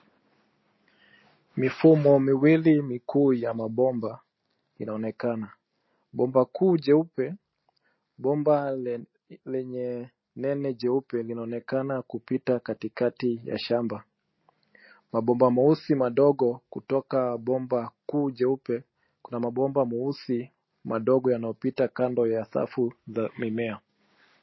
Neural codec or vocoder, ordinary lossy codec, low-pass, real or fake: none; MP3, 24 kbps; 7.2 kHz; real